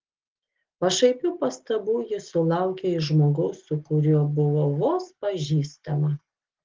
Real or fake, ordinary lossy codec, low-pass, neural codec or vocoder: real; Opus, 16 kbps; 7.2 kHz; none